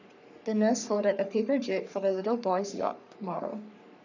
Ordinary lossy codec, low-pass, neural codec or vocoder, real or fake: none; 7.2 kHz; codec, 44.1 kHz, 3.4 kbps, Pupu-Codec; fake